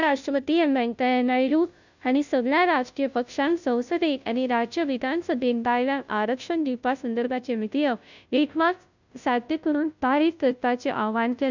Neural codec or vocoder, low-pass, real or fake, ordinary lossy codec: codec, 16 kHz, 0.5 kbps, FunCodec, trained on Chinese and English, 25 frames a second; 7.2 kHz; fake; none